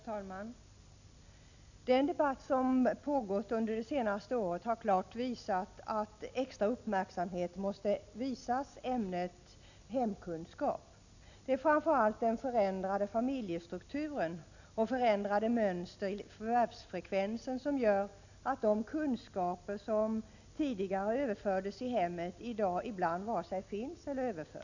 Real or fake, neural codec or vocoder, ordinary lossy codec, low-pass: real; none; none; 7.2 kHz